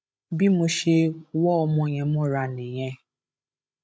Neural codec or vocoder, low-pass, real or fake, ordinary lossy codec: codec, 16 kHz, 16 kbps, FreqCodec, larger model; none; fake; none